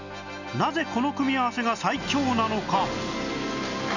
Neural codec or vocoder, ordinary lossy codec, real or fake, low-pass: none; none; real; 7.2 kHz